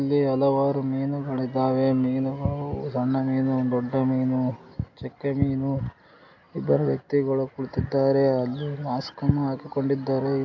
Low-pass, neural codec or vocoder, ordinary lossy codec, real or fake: 7.2 kHz; none; AAC, 48 kbps; real